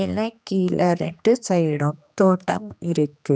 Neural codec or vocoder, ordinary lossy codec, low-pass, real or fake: codec, 16 kHz, 2 kbps, X-Codec, HuBERT features, trained on general audio; none; none; fake